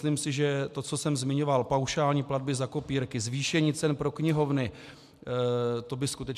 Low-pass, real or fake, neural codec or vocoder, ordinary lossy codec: 14.4 kHz; real; none; MP3, 96 kbps